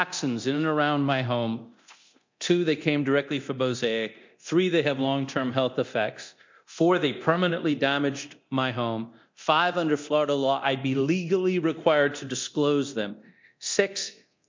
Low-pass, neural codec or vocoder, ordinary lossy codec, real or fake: 7.2 kHz; codec, 24 kHz, 0.9 kbps, DualCodec; MP3, 48 kbps; fake